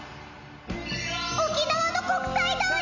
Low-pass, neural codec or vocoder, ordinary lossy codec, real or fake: 7.2 kHz; none; none; real